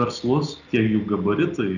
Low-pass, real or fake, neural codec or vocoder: 7.2 kHz; real; none